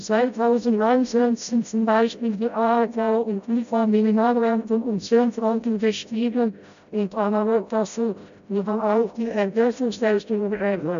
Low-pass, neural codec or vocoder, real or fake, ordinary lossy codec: 7.2 kHz; codec, 16 kHz, 0.5 kbps, FreqCodec, smaller model; fake; AAC, 96 kbps